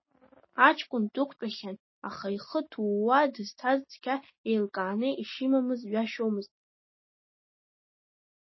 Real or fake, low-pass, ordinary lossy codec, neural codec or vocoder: real; 7.2 kHz; MP3, 24 kbps; none